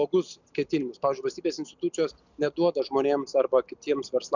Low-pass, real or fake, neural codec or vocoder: 7.2 kHz; real; none